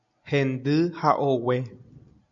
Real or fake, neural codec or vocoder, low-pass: real; none; 7.2 kHz